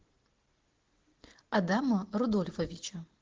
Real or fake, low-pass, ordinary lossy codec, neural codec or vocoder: real; 7.2 kHz; Opus, 16 kbps; none